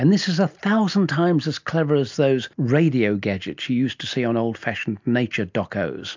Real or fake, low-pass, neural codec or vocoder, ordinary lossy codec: real; 7.2 kHz; none; MP3, 64 kbps